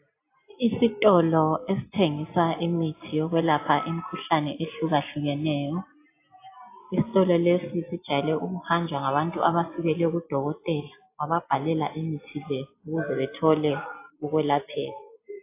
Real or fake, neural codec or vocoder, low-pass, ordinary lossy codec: real; none; 3.6 kHz; AAC, 24 kbps